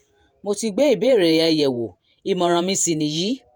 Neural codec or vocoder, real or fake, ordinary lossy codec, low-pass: vocoder, 48 kHz, 128 mel bands, Vocos; fake; none; none